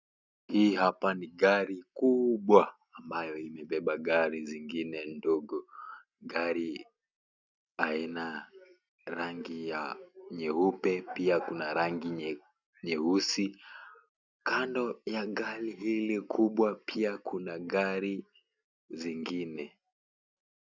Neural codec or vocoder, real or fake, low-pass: none; real; 7.2 kHz